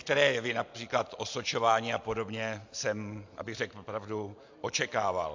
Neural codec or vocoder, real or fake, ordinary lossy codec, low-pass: none; real; AAC, 48 kbps; 7.2 kHz